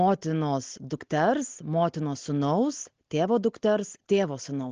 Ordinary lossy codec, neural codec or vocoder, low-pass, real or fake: Opus, 16 kbps; none; 7.2 kHz; real